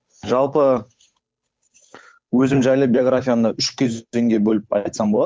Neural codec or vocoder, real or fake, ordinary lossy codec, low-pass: codec, 16 kHz, 8 kbps, FunCodec, trained on Chinese and English, 25 frames a second; fake; none; none